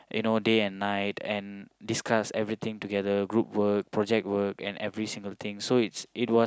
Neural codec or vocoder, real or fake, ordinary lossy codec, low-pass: none; real; none; none